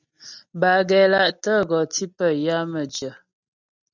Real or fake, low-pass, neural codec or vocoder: real; 7.2 kHz; none